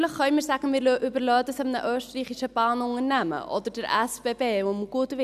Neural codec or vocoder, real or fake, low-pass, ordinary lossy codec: none; real; 14.4 kHz; none